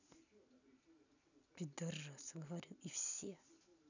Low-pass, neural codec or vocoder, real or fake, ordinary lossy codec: 7.2 kHz; none; real; none